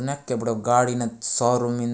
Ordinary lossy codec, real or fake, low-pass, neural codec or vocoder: none; real; none; none